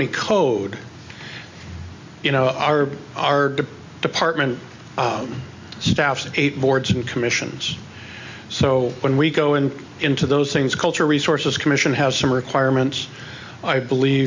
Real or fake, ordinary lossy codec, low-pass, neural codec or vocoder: real; MP3, 64 kbps; 7.2 kHz; none